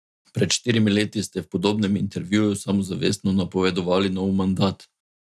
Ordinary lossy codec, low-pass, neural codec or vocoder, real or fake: none; none; none; real